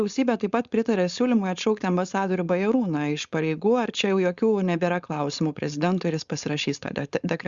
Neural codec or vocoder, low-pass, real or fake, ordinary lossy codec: codec, 16 kHz, 4.8 kbps, FACodec; 7.2 kHz; fake; Opus, 64 kbps